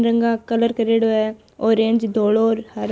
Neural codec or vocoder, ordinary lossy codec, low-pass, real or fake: none; none; none; real